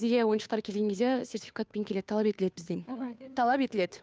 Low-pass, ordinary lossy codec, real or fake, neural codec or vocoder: none; none; fake; codec, 16 kHz, 2 kbps, FunCodec, trained on Chinese and English, 25 frames a second